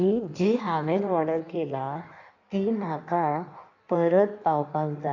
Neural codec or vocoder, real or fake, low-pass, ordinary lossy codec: codec, 16 kHz in and 24 kHz out, 1.1 kbps, FireRedTTS-2 codec; fake; 7.2 kHz; none